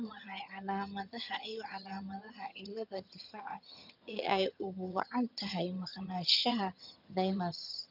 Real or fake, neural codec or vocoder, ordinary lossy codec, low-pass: fake; vocoder, 22.05 kHz, 80 mel bands, HiFi-GAN; none; 5.4 kHz